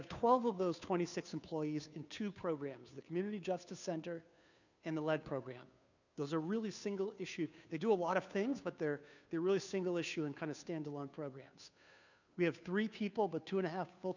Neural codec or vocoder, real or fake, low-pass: codec, 16 kHz, 2 kbps, FunCodec, trained on Chinese and English, 25 frames a second; fake; 7.2 kHz